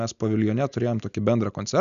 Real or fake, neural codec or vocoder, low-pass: real; none; 7.2 kHz